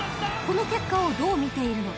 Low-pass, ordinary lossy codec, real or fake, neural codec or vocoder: none; none; real; none